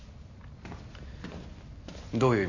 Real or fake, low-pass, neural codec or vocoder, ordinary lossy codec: real; 7.2 kHz; none; none